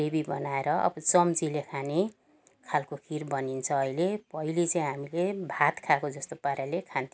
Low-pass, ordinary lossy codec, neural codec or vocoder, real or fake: none; none; none; real